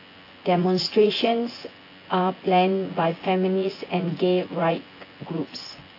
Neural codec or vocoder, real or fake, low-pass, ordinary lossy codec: vocoder, 24 kHz, 100 mel bands, Vocos; fake; 5.4 kHz; AAC, 24 kbps